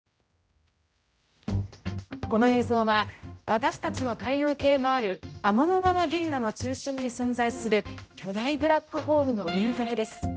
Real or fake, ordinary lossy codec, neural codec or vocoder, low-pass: fake; none; codec, 16 kHz, 0.5 kbps, X-Codec, HuBERT features, trained on general audio; none